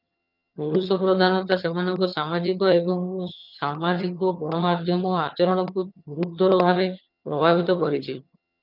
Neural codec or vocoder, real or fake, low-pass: vocoder, 22.05 kHz, 80 mel bands, HiFi-GAN; fake; 5.4 kHz